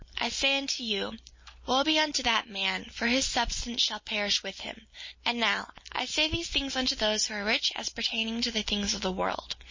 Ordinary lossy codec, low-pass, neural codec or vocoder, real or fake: MP3, 32 kbps; 7.2 kHz; none; real